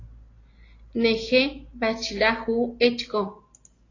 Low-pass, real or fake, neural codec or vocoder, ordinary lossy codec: 7.2 kHz; real; none; AAC, 32 kbps